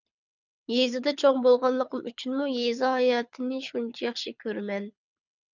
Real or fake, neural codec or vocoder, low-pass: fake; codec, 24 kHz, 6 kbps, HILCodec; 7.2 kHz